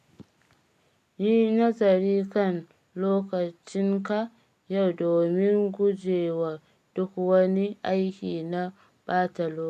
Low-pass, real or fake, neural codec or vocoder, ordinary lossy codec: 14.4 kHz; real; none; none